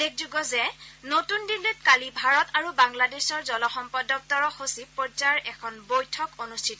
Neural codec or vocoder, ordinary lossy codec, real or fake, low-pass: none; none; real; none